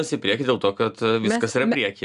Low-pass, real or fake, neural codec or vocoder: 10.8 kHz; real; none